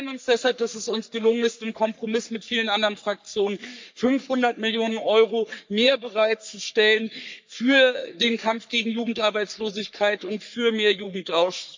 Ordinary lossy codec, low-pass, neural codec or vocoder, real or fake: MP3, 64 kbps; 7.2 kHz; codec, 44.1 kHz, 3.4 kbps, Pupu-Codec; fake